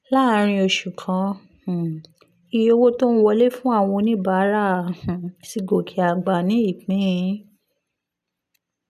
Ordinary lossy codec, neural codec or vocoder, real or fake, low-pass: none; none; real; 14.4 kHz